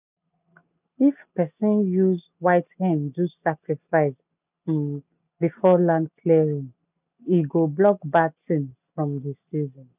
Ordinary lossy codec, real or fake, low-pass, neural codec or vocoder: none; real; 3.6 kHz; none